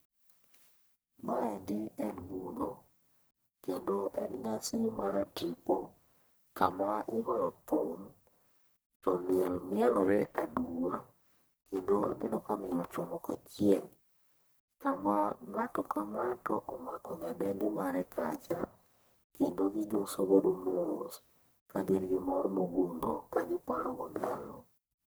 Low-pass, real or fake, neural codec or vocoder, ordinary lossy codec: none; fake; codec, 44.1 kHz, 1.7 kbps, Pupu-Codec; none